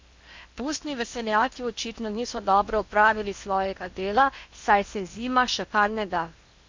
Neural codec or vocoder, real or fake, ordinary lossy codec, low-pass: codec, 16 kHz in and 24 kHz out, 0.8 kbps, FocalCodec, streaming, 65536 codes; fake; MP3, 64 kbps; 7.2 kHz